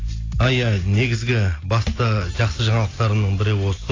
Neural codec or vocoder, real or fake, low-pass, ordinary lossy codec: none; real; 7.2 kHz; AAC, 32 kbps